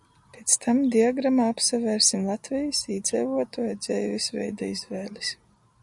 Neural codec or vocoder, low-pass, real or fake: none; 10.8 kHz; real